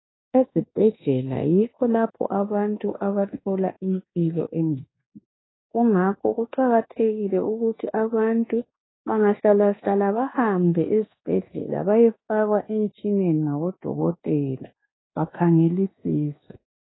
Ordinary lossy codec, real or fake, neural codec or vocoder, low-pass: AAC, 16 kbps; fake; codec, 24 kHz, 1.2 kbps, DualCodec; 7.2 kHz